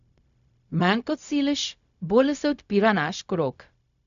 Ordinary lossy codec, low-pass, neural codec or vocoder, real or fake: none; 7.2 kHz; codec, 16 kHz, 0.4 kbps, LongCat-Audio-Codec; fake